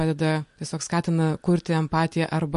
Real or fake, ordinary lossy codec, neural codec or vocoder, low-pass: real; MP3, 48 kbps; none; 14.4 kHz